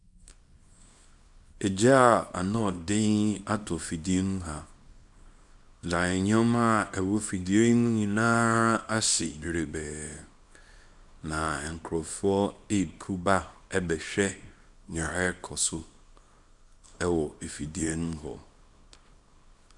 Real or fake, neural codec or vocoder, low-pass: fake; codec, 24 kHz, 0.9 kbps, WavTokenizer, small release; 10.8 kHz